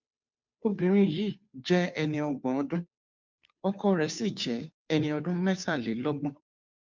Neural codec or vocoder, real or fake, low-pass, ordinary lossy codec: codec, 16 kHz, 2 kbps, FunCodec, trained on Chinese and English, 25 frames a second; fake; 7.2 kHz; MP3, 64 kbps